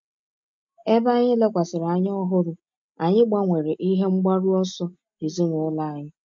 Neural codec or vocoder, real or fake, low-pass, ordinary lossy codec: none; real; 7.2 kHz; MP3, 48 kbps